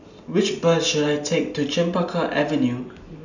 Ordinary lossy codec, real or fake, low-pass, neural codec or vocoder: none; real; 7.2 kHz; none